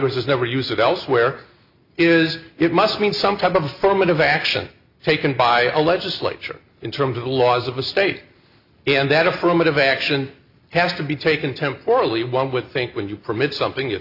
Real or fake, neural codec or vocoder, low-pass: real; none; 5.4 kHz